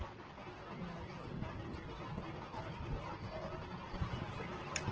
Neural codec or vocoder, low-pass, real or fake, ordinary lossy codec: none; 7.2 kHz; real; Opus, 16 kbps